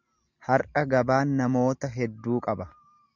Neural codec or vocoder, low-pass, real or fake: none; 7.2 kHz; real